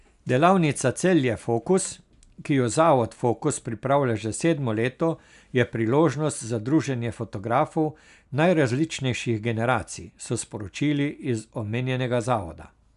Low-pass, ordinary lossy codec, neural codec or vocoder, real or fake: 10.8 kHz; none; none; real